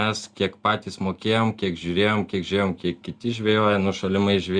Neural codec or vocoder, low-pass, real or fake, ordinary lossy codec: none; 9.9 kHz; real; Opus, 64 kbps